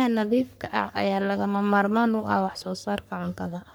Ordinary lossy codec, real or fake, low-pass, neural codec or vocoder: none; fake; none; codec, 44.1 kHz, 3.4 kbps, Pupu-Codec